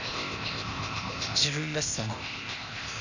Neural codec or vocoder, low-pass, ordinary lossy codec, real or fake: codec, 16 kHz, 0.8 kbps, ZipCodec; 7.2 kHz; none; fake